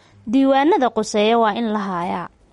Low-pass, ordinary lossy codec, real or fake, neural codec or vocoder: 19.8 kHz; MP3, 48 kbps; real; none